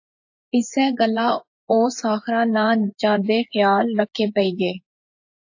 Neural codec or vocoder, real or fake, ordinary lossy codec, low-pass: vocoder, 24 kHz, 100 mel bands, Vocos; fake; AAC, 48 kbps; 7.2 kHz